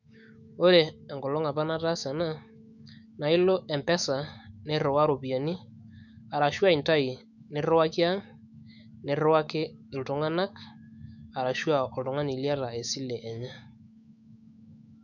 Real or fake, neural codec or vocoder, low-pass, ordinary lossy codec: fake; autoencoder, 48 kHz, 128 numbers a frame, DAC-VAE, trained on Japanese speech; 7.2 kHz; none